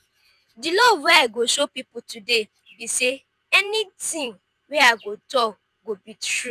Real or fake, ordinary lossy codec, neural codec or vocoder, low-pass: fake; none; vocoder, 48 kHz, 128 mel bands, Vocos; 14.4 kHz